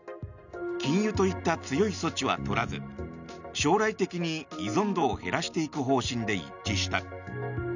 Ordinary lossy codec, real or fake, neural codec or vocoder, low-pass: none; real; none; 7.2 kHz